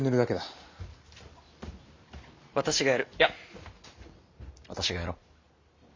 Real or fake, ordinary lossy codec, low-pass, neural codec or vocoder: real; MP3, 64 kbps; 7.2 kHz; none